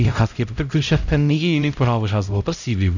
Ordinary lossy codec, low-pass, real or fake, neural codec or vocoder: none; 7.2 kHz; fake; codec, 16 kHz, 0.5 kbps, X-Codec, HuBERT features, trained on LibriSpeech